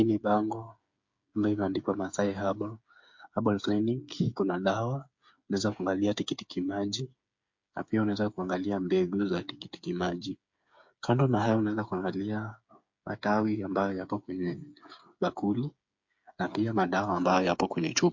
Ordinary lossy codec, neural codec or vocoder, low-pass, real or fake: MP3, 48 kbps; codec, 16 kHz, 8 kbps, FreqCodec, smaller model; 7.2 kHz; fake